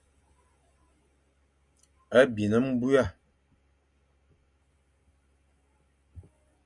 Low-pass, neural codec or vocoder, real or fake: 10.8 kHz; none; real